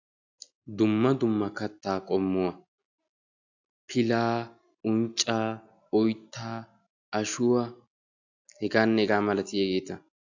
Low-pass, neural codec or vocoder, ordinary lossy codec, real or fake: 7.2 kHz; none; AAC, 48 kbps; real